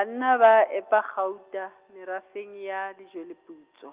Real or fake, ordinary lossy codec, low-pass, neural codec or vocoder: real; Opus, 24 kbps; 3.6 kHz; none